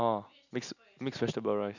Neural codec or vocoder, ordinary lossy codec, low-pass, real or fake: none; none; 7.2 kHz; real